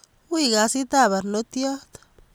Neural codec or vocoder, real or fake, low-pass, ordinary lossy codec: none; real; none; none